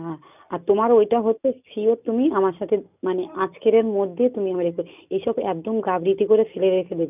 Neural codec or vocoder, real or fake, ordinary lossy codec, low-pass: none; real; none; 3.6 kHz